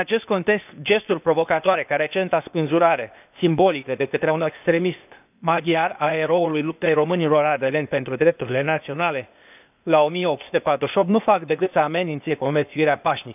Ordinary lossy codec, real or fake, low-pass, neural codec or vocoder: none; fake; 3.6 kHz; codec, 16 kHz, 0.8 kbps, ZipCodec